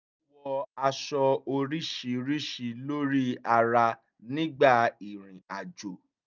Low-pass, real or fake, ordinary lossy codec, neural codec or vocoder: 7.2 kHz; real; none; none